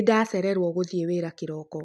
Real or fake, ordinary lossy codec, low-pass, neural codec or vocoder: real; none; none; none